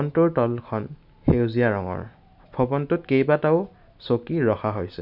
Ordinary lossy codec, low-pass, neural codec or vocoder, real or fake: none; 5.4 kHz; none; real